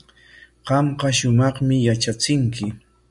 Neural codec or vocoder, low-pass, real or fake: none; 10.8 kHz; real